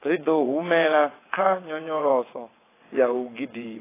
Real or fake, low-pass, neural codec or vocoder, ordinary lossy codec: fake; 3.6 kHz; vocoder, 22.05 kHz, 80 mel bands, WaveNeXt; AAC, 16 kbps